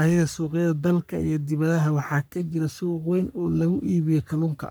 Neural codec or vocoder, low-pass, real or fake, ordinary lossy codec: codec, 44.1 kHz, 3.4 kbps, Pupu-Codec; none; fake; none